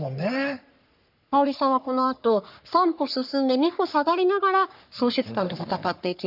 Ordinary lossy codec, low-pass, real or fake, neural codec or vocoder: none; 5.4 kHz; fake; codec, 44.1 kHz, 3.4 kbps, Pupu-Codec